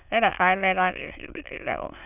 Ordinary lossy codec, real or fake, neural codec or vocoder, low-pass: none; fake; autoencoder, 22.05 kHz, a latent of 192 numbers a frame, VITS, trained on many speakers; 3.6 kHz